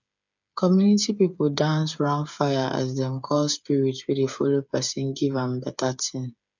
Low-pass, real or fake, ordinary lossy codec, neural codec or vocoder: 7.2 kHz; fake; none; codec, 16 kHz, 8 kbps, FreqCodec, smaller model